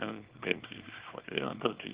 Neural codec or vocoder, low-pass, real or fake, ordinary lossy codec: codec, 24 kHz, 0.9 kbps, WavTokenizer, small release; 3.6 kHz; fake; Opus, 16 kbps